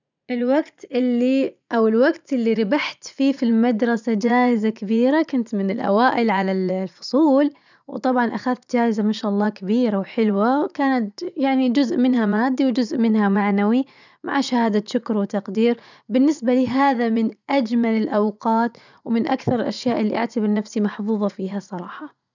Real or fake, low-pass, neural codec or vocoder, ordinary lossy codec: fake; 7.2 kHz; vocoder, 44.1 kHz, 80 mel bands, Vocos; none